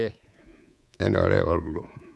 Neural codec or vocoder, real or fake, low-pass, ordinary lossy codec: codec, 24 kHz, 3.1 kbps, DualCodec; fake; none; none